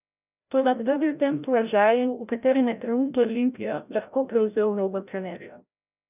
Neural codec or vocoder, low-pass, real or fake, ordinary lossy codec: codec, 16 kHz, 0.5 kbps, FreqCodec, larger model; 3.6 kHz; fake; none